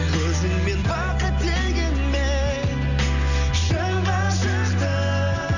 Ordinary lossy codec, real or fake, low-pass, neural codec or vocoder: none; real; 7.2 kHz; none